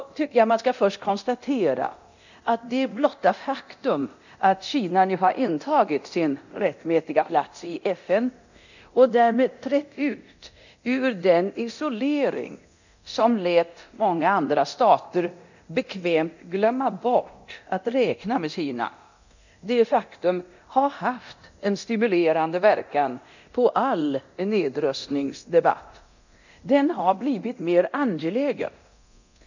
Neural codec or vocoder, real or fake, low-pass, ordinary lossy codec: codec, 24 kHz, 0.9 kbps, DualCodec; fake; 7.2 kHz; none